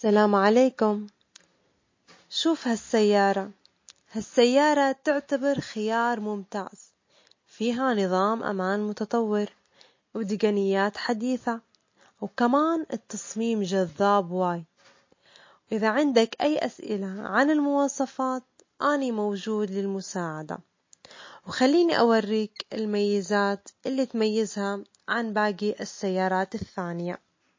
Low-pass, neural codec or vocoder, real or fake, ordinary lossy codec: 7.2 kHz; none; real; MP3, 32 kbps